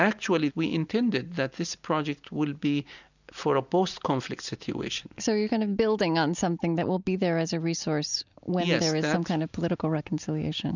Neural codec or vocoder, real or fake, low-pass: none; real; 7.2 kHz